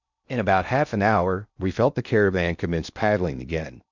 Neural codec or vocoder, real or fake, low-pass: codec, 16 kHz in and 24 kHz out, 0.6 kbps, FocalCodec, streaming, 2048 codes; fake; 7.2 kHz